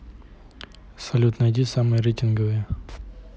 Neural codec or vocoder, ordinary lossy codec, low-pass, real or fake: none; none; none; real